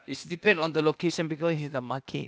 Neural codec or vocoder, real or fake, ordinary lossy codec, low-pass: codec, 16 kHz, 0.8 kbps, ZipCodec; fake; none; none